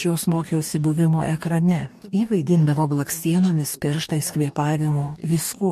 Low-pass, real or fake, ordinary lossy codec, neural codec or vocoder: 14.4 kHz; fake; MP3, 64 kbps; codec, 44.1 kHz, 2.6 kbps, DAC